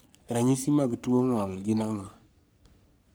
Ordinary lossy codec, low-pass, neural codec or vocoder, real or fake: none; none; codec, 44.1 kHz, 3.4 kbps, Pupu-Codec; fake